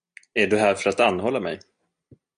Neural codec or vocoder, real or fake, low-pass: none; real; 9.9 kHz